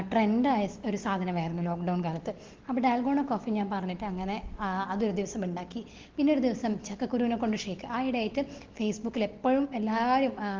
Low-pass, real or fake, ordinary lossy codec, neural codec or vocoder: 7.2 kHz; real; Opus, 16 kbps; none